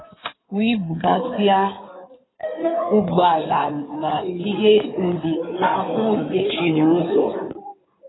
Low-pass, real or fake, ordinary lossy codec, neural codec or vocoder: 7.2 kHz; fake; AAC, 16 kbps; codec, 16 kHz in and 24 kHz out, 2.2 kbps, FireRedTTS-2 codec